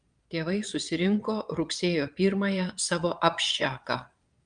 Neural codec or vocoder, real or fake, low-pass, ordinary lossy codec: vocoder, 22.05 kHz, 80 mel bands, Vocos; fake; 9.9 kHz; Opus, 32 kbps